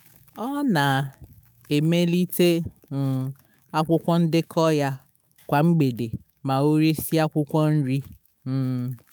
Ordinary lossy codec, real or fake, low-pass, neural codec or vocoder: none; fake; none; autoencoder, 48 kHz, 128 numbers a frame, DAC-VAE, trained on Japanese speech